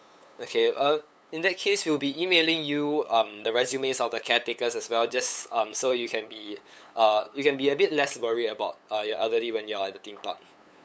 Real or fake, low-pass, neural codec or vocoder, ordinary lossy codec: fake; none; codec, 16 kHz, 8 kbps, FunCodec, trained on LibriTTS, 25 frames a second; none